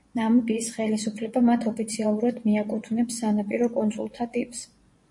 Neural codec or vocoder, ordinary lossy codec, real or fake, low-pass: vocoder, 24 kHz, 100 mel bands, Vocos; MP3, 48 kbps; fake; 10.8 kHz